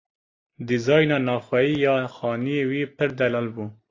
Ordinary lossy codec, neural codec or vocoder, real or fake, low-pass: AAC, 32 kbps; none; real; 7.2 kHz